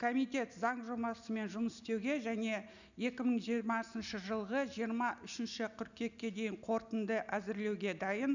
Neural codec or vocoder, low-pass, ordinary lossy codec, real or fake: none; 7.2 kHz; none; real